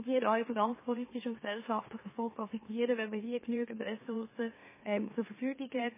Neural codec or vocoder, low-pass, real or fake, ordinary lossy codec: autoencoder, 44.1 kHz, a latent of 192 numbers a frame, MeloTTS; 3.6 kHz; fake; MP3, 16 kbps